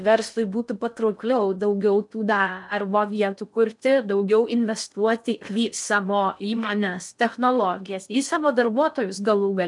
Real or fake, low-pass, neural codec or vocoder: fake; 10.8 kHz; codec, 16 kHz in and 24 kHz out, 0.6 kbps, FocalCodec, streaming, 4096 codes